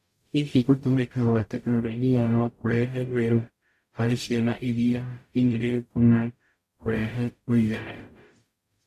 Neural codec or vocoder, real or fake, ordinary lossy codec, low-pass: codec, 44.1 kHz, 0.9 kbps, DAC; fake; MP3, 64 kbps; 14.4 kHz